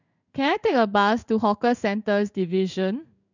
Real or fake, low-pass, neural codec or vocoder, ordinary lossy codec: fake; 7.2 kHz; codec, 16 kHz in and 24 kHz out, 1 kbps, XY-Tokenizer; none